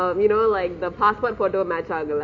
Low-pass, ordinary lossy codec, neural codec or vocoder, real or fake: 7.2 kHz; none; none; real